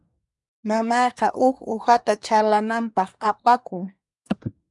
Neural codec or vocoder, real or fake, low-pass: codec, 24 kHz, 1 kbps, SNAC; fake; 10.8 kHz